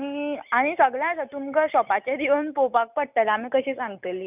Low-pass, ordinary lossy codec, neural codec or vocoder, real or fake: 3.6 kHz; none; none; real